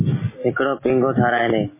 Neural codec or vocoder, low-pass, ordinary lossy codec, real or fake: none; 3.6 kHz; MP3, 16 kbps; real